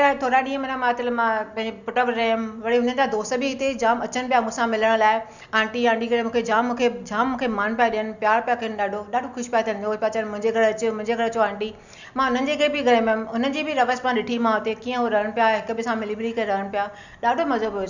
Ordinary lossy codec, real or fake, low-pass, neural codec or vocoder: none; real; 7.2 kHz; none